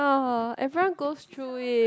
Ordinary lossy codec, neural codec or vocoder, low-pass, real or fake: none; none; none; real